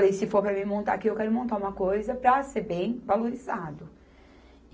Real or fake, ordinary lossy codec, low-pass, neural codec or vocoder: real; none; none; none